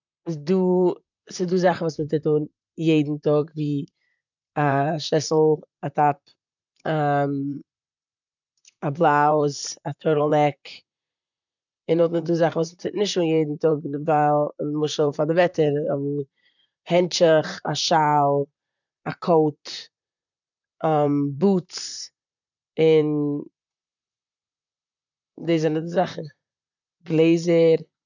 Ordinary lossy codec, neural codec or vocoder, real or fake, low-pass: none; vocoder, 44.1 kHz, 128 mel bands, Pupu-Vocoder; fake; 7.2 kHz